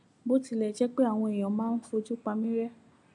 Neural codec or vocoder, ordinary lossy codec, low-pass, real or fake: none; none; 10.8 kHz; real